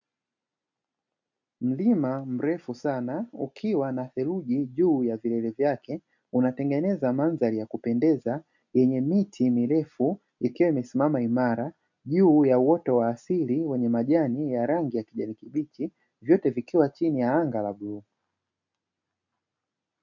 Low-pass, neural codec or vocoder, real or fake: 7.2 kHz; none; real